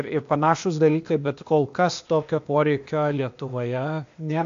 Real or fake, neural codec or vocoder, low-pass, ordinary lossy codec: fake; codec, 16 kHz, 0.8 kbps, ZipCodec; 7.2 kHz; AAC, 64 kbps